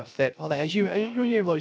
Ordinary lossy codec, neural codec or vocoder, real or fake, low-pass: none; codec, 16 kHz, 0.3 kbps, FocalCodec; fake; none